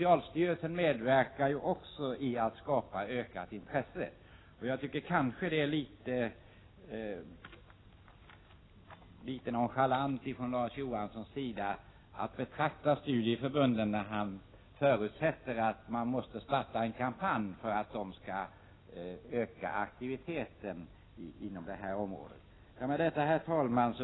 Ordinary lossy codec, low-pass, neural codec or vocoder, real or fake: AAC, 16 kbps; 7.2 kHz; none; real